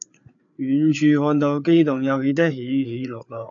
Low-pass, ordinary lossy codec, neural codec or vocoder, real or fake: 7.2 kHz; AAC, 64 kbps; codec, 16 kHz, 4 kbps, FreqCodec, larger model; fake